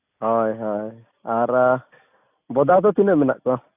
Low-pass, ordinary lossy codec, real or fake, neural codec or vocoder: 3.6 kHz; AAC, 32 kbps; real; none